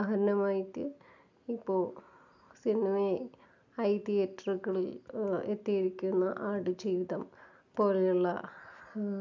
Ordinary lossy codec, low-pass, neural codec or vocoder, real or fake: none; 7.2 kHz; none; real